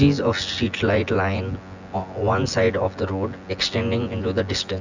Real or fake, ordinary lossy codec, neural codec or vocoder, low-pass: fake; Opus, 64 kbps; vocoder, 24 kHz, 100 mel bands, Vocos; 7.2 kHz